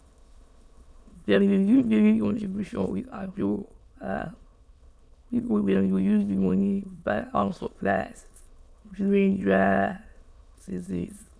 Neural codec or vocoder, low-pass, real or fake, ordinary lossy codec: autoencoder, 22.05 kHz, a latent of 192 numbers a frame, VITS, trained on many speakers; none; fake; none